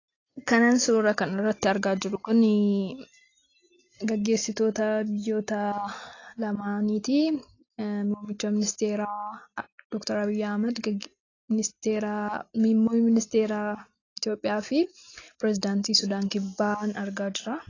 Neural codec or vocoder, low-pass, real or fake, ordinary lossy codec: none; 7.2 kHz; real; AAC, 32 kbps